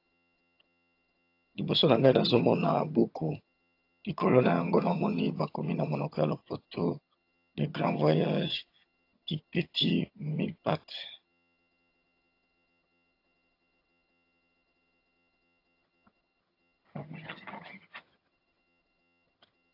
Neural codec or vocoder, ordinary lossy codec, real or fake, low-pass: vocoder, 22.05 kHz, 80 mel bands, HiFi-GAN; AAC, 32 kbps; fake; 5.4 kHz